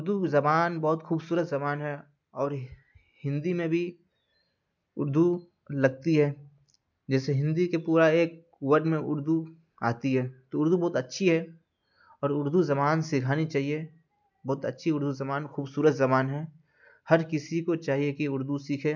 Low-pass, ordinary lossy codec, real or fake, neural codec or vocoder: 7.2 kHz; MP3, 64 kbps; real; none